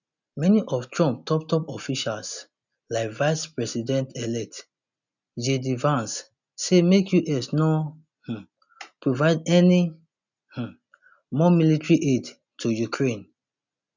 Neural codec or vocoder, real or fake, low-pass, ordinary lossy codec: none; real; 7.2 kHz; none